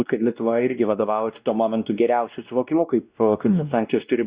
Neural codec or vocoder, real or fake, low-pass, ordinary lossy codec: codec, 16 kHz, 1 kbps, X-Codec, WavLM features, trained on Multilingual LibriSpeech; fake; 3.6 kHz; Opus, 64 kbps